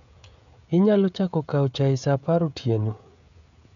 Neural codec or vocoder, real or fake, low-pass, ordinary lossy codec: codec, 16 kHz, 16 kbps, FreqCodec, smaller model; fake; 7.2 kHz; none